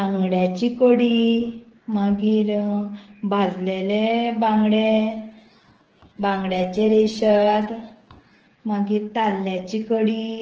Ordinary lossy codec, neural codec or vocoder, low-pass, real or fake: Opus, 16 kbps; codec, 16 kHz, 8 kbps, FreqCodec, smaller model; 7.2 kHz; fake